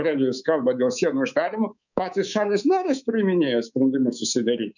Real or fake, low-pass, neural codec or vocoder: fake; 7.2 kHz; codec, 24 kHz, 3.1 kbps, DualCodec